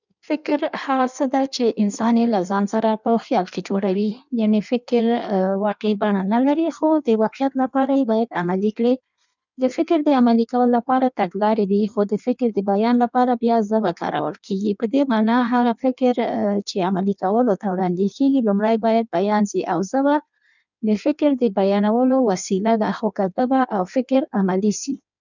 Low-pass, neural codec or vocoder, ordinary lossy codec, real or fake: 7.2 kHz; codec, 16 kHz in and 24 kHz out, 1.1 kbps, FireRedTTS-2 codec; none; fake